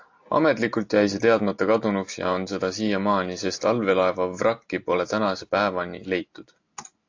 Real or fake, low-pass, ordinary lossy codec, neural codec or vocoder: real; 7.2 kHz; AAC, 48 kbps; none